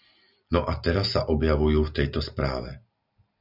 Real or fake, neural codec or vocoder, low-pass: real; none; 5.4 kHz